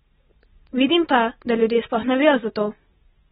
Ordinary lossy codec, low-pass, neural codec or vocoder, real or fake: AAC, 16 kbps; 7.2 kHz; none; real